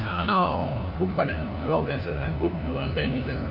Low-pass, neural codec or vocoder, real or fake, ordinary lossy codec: 5.4 kHz; codec, 16 kHz, 1 kbps, FunCodec, trained on LibriTTS, 50 frames a second; fake; none